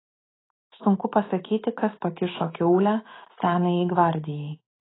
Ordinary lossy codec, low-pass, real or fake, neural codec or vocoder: AAC, 16 kbps; 7.2 kHz; fake; autoencoder, 48 kHz, 128 numbers a frame, DAC-VAE, trained on Japanese speech